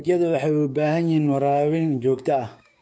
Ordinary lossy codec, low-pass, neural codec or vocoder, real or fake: none; none; codec, 16 kHz, 6 kbps, DAC; fake